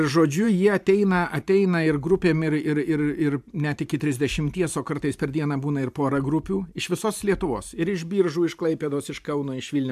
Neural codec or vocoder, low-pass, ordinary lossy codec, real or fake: vocoder, 44.1 kHz, 128 mel bands every 512 samples, BigVGAN v2; 14.4 kHz; AAC, 96 kbps; fake